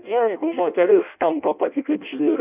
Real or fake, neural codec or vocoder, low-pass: fake; codec, 16 kHz in and 24 kHz out, 0.6 kbps, FireRedTTS-2 codec; 3.6 kHz